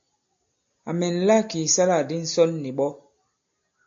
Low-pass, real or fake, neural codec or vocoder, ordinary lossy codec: 7.2 kHz; real; none; AAC, 64 kbps